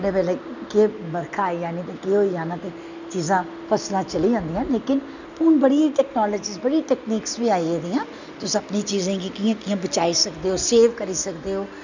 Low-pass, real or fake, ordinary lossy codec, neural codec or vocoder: 7.2 kHz; real; none; none